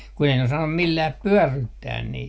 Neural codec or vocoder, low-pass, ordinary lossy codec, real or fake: none; none; none; real